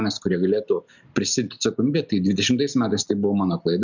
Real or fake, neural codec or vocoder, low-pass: real; none; 7.2 kHz